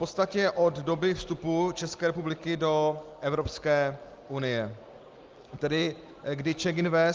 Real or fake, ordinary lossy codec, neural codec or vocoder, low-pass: real; Opus, 24 kbps; none; 7.2 kHz